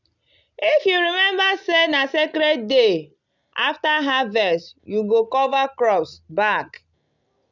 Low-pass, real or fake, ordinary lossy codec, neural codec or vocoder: 7.2 kHz; real; none; none